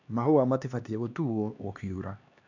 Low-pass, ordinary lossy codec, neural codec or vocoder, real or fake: 7.2 kHz; none; codec, 16 kHz, 2 kbps, X-Codec, HuBERT features, trained on LibriSpeech; fake